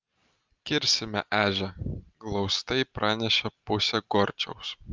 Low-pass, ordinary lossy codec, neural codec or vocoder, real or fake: 7.2 kHz; Opus, 24 kbps; none; real